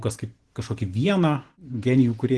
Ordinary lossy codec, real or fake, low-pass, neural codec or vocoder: Opus, 16 kbps; fake; 10.8 kHz; vocoder, 44.1 kHz, 128 mel bands every 512 samples, BigVGAN v2